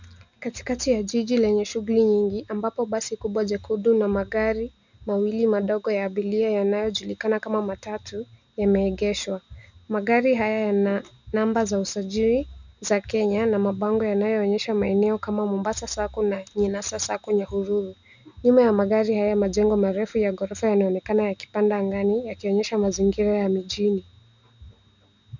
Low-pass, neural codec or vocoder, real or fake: 7.2 kHz; none; real